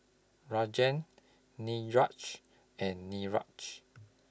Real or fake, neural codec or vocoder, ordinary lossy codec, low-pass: real; none; none; none